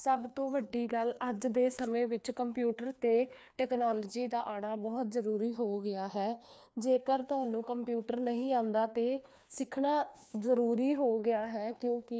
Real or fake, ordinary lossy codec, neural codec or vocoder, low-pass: fake; none; codec, 16 kHz, 2 kbps, FreqCodec, larger model; none